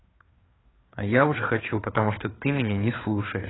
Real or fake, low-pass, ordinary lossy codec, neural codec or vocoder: fake; 7.2 kHz; AAC, 16 kbps; codec, 16 kHz, 4 kbps, FreqCodec, larger model